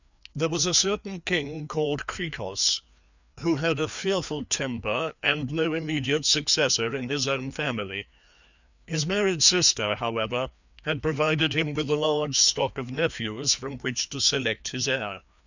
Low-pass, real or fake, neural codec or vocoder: 7.2 kHz; fake; codec, 16 kHz, 2 kbps, FreqCodec, larger model